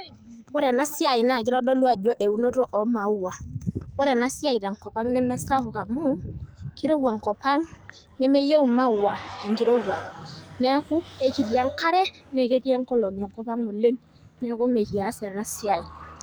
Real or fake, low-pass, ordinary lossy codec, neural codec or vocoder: fake; none; none; codec, 44.1 kHz, 2.6 kbps, SNAC